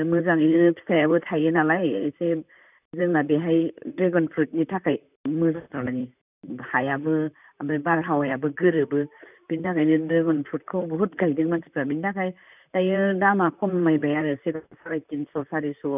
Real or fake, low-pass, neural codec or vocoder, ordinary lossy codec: fake; 3.6 kHz; vocoder, 44.1 kHz, 128 mel bands, Pupu-Vocoder; none